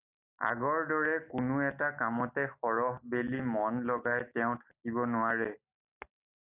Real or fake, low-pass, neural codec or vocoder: real; 3.6 kHz; none